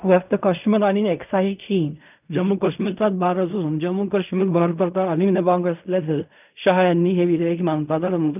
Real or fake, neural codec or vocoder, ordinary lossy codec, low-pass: fake; codec, 16 kHz in and 24 kHz out, 0.4 kbps, LongCat-Audio-Codec, fine tuned four codebook decoder; none; 3.6 kHz